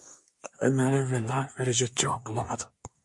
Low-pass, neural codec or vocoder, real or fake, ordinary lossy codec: 10.8 kHz; codec, 24 kHz, 1 kbps, SNAC; fake; MP3, 48 kbps